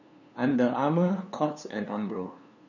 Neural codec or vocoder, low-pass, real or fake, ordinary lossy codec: codec, 16 kHz, 2 kbps, FunCodec, trained on LibriTTS, 25 frames a second; 7.2 kHz; fake; none